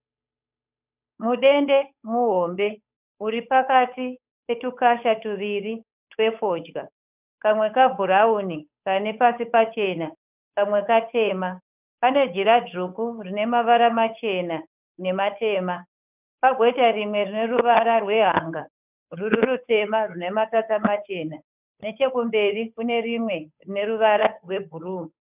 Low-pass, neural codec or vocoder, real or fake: 3.6 kHz; codec, 16 kHz, 8 kbps, FunCodec, trained on Chinese and English, 25 frames a second; fake